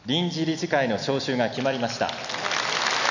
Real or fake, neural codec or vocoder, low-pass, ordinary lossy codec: real; none; 7.2 kHz; none